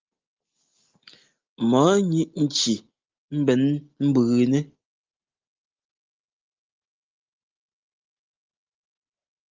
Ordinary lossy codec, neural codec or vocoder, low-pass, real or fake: Opus, 32 kbps; none; 7.2 kHz; real